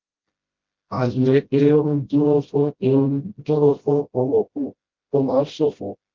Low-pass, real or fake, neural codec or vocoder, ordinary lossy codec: 7.2 kHz; fake; codec, 16 kHz, 0.5 kbps, FreqCodec, smaller model; Opus, 32 kbps